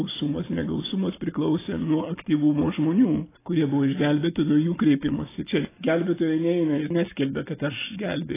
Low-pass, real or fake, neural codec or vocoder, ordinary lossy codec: 3.6 kHz; real; none; AAC, 16 kbps